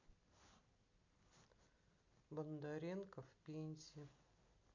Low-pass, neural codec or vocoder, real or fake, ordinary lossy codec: 7.2 kHz; none; real; MP3, 64 kbps